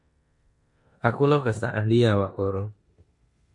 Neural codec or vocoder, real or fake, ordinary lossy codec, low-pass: codec, 16 kHz in and 24 kHz out, 0.9 kbps, LongCat-Audio-Codec, four codebook decoder; fake; MP3, 48 kbps; 10.8 kHz